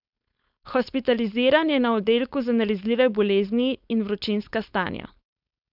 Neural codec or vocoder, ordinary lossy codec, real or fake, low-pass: codec, 16 kHz, 4.8 kbps, FACodec; none; fake; 5.4 kHz